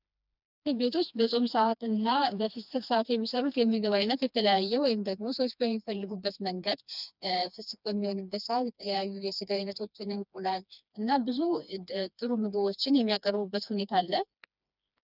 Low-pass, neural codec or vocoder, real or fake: 5.4 kHz; codec, 16 kHz, 2 kbps, FreqCodec, smaller model; fake